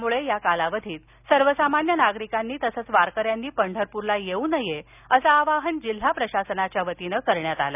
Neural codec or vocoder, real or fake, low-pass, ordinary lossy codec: none; real; 3.6 kHz; none